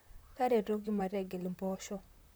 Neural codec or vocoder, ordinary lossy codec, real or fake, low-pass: vocoder, 44.1 kHz, 128 mel bands, Pupu-Vocoder; none; fake; none